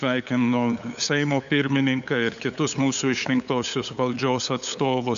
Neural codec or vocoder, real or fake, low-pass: codec, 16 kHz, 8 kbps, FunCodec, trained on LibriTTS, 25 frames a second; fake; 7.2 kHz